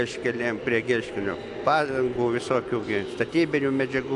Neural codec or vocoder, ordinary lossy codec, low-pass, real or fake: none; AAC, 64 kbps; 10.8 kHz; real